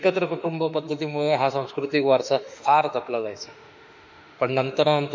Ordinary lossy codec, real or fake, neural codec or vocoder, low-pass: MP3, 64 kbps; fake; autoencoder, 48 kHz, 32 numbers a frame, DAC-VAE, trained on Japanese speech; 7.2 kHz